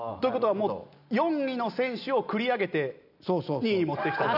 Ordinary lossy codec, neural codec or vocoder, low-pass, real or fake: none; none; 5.4 kHz; real